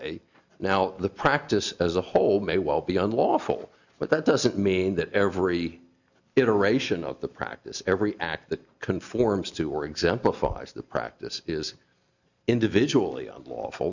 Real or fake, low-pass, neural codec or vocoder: real; 7.2 kHz; none